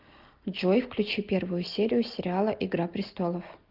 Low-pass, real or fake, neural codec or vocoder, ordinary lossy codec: 5.4 kHz; real; none; Opus, 32 kbps